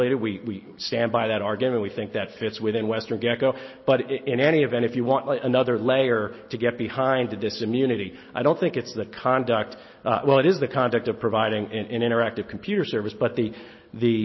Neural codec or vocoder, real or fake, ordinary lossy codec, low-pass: none; real; MP3, 24 kbps; 7.2 kHz